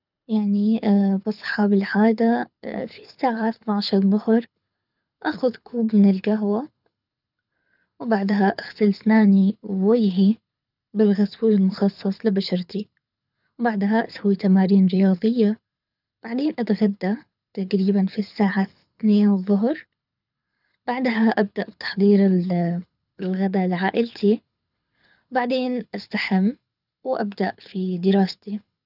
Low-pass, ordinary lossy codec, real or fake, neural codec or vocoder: 5.4 kHz; none; fake; codec, 24 kHz, 6 kbps, HILCodec